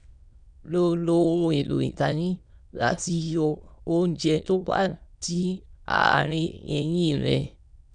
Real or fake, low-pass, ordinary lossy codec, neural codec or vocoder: fake; 9.9 kHz; none; autoencoder, 22.05 kHz, a latent of 192 numbers a frame, VITS, trained on many speakers